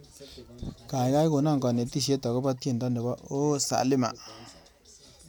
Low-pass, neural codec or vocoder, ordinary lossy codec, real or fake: none; none; none; real